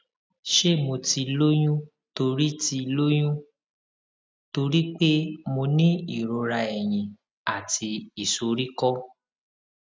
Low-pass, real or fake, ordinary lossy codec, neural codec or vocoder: none; real; none; none